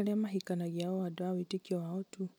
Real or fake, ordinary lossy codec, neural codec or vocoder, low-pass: real; none; none; none